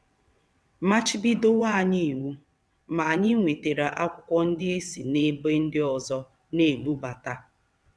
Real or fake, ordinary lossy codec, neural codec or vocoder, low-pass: fake; none; vocoder, 22.05 kHz, 80 mel bands, WaveNeXt; none